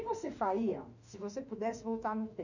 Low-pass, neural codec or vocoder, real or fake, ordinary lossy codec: 7.2 kHz; autoencoder, 48 kHz, 32 numbers a frame, DAC-VAE, trained on Japanese speech; fake; none